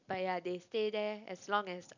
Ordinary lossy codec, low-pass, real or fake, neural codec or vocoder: none; 7.2 kHz; real; none